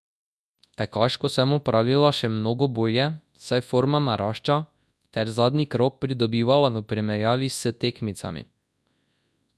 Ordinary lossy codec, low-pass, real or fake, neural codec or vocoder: none; none; fake; codec, 24 kHz, 0.9 kbps, WavTokenizer, large speech release